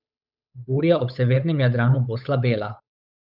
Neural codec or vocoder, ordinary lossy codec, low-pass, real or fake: codec, 16 kHz, 8 kbps, FunCodec, trained on Chinese and English, 25 frames a second; none; 5.4 kHz; fake